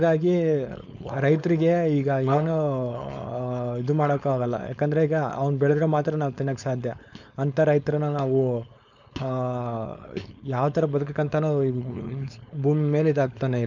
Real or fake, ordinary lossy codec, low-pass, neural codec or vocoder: fake; none; 7.2 kHz; codec, 16 kHz, 4.8 kbps, FACodec